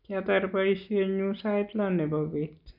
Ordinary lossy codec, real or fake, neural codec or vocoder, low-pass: none; real; none; 5.4 kHz